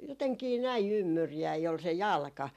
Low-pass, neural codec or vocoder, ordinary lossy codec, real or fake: 14.4 kHz; none; none; real